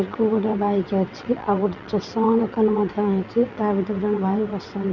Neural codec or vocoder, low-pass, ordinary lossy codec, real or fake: vocoder, 22.05 kHz, 80 mel bands, WaveNeXt; 7.2 kHz; none; fake